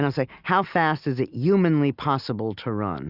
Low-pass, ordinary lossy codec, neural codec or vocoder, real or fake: 5.4 kHz; Opus, 64 kbps; none; real